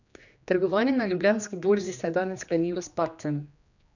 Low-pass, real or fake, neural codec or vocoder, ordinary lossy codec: 7.2 kHz; fake; codec, 16 kHz, 2 kbps, X-Codec, HuBERT features, trained on general audio; none